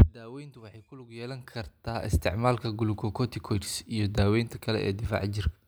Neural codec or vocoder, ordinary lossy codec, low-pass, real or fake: none; none; none; real